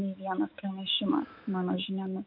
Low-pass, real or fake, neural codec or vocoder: 5.4 kHz; fake; autoencoder, 48 kHz, 128 numbers a frame, DAC-VAE, trained on Japanese speech